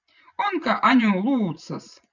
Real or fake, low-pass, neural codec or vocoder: fake; 7.2 kHz; vocoder, 24 kHz, 100 mel bands, Vocos